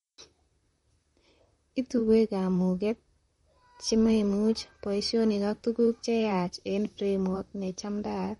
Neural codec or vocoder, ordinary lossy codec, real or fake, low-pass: vocoder, 44.1 kHz, 128 mel bands, Pupu-Vocoder; MP3, 48 kbps; fake; 19.8 kHz